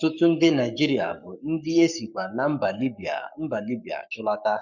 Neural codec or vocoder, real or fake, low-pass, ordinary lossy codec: codec, 44.1 kHz, 7.8 kbps, Pupu-Codec; fake; 7.2 kHz; none